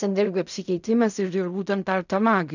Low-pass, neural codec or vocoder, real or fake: 7.2 kHz; codec, 16 kHz in and 24 kHz out, 0.4 kbps, LongCat-Audio-Codec, fine tuned four codebook decoder; fake